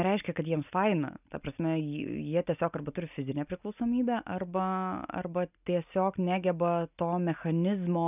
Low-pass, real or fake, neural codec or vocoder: 3.6 kHz; real; none